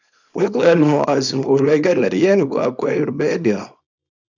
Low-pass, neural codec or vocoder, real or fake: 7.2 kHz; codec, 24 kHz, 0.9 kbps, WavTokenizer, small release; fake